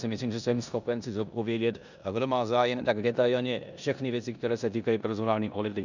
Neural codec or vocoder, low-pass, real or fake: codec, 16 kHz in and 24 kHz out, 0.9 kbps, LongCat-Audio-Codec, four codebook decoder; 7.2 kHz; fake